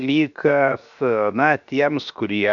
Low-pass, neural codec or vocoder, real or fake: 7.2 kHz; codec, 16 kHz, 0.7 kbps, FocalCodec; fake